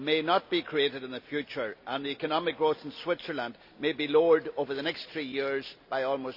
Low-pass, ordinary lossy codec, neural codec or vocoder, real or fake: 5.4 kHz; none; none; real